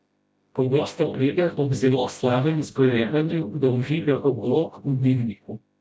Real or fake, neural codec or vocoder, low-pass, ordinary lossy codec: fake; codec, 16 kHz, 0.5 kbps, FreqCodec, smaller model; none; none